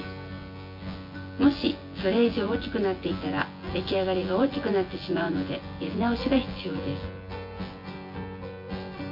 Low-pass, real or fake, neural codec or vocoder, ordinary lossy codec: 5.4 kHz; fake; vocoder, 24 kHz, 100 mel bands, Vocos; MP3, 32 kbps